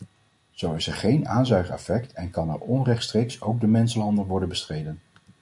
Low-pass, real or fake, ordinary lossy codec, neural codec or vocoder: 10.8 kHz; real; MP3, 64 kbps; none